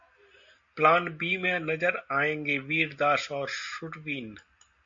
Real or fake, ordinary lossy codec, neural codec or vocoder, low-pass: real; MP3, 48 kbps; none; 7.2 kHz